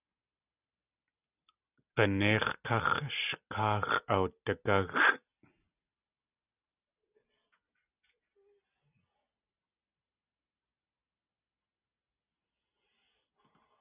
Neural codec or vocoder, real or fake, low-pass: none; real; 3.6 kHz